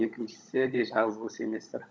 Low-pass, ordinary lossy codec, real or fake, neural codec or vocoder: none; none; fake; codec, 16 kHz, 16 kbps, FunCodec, trained on LibriTTS, 50 frames a second